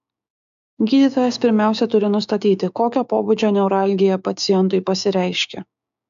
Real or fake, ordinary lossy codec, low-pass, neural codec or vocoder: fake; AAC, 96 kbps; 7.2 kHz; codec, 16 kHz, 6 kbps, DAC